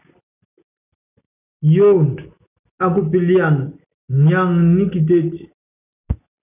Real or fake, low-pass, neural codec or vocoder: real; 3.6 kHz; none